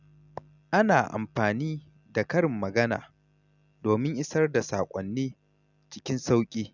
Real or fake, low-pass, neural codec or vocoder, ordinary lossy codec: real; 7.2 kHz; none; none